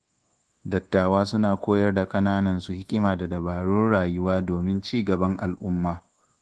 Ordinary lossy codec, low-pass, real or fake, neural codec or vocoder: Opus, 16 kbps; 10.8 kHz; fake; codec, 24 kHz, 1.2 kbps, DualCodec